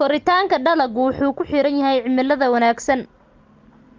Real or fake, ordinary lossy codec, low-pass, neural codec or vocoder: real; Opus, 24 kbps; 7.2 kHz; none